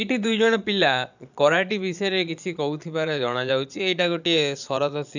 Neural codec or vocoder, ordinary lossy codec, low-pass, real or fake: vocoder, 22.05 kHz, 80 mel bands, Vocos; none; 7.2 kHz; fake